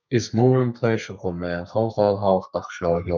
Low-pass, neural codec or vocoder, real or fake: 7.2 kHz; codec, 32 kHz, 1.9 kbps, SNAC; fake